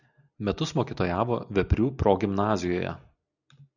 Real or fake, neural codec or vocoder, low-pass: real; none; 7.2 kHz